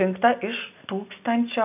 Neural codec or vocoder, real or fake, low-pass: none; real; 3.6 kHz